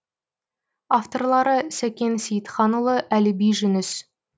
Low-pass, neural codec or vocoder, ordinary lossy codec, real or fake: none; none; none; real